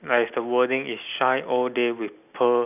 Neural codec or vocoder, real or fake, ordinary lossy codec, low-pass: none; real; none; 3.6 kHz